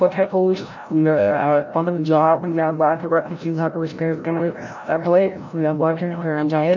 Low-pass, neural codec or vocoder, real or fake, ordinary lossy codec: 7.2 kHz; codec, 16 kHz, 0.5 kbps, FreqCodec, larger model; fake; none